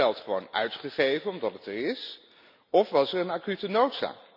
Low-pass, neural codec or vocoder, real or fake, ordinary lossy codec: 5.4 kHz; none; real; none